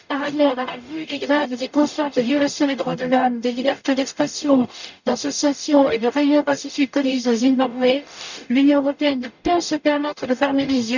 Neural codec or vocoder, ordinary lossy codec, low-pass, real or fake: codec, 44.1 kHz, 0.9 kbps, DAC; none; 7.2 kHz; fake